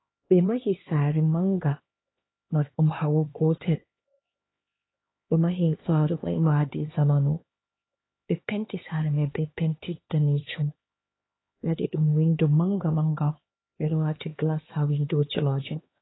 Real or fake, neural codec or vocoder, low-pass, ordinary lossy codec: fake; codec, 16 kHz, 2 kbps, X-Codec, HuBERT features, trained on LibriSpeech; 7.2 kHz; AAC, 16 kbps